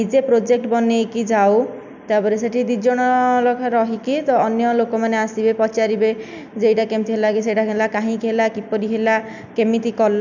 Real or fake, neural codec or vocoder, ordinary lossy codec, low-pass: real; none; none; 7.2 kHz